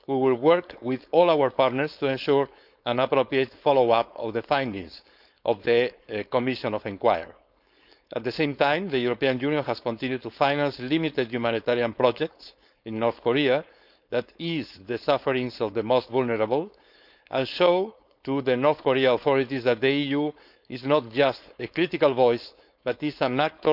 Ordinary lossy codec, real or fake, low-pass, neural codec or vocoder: none; fake; 5.4 kHz; codec, 16 kHz, 4.8 kbps, FACodec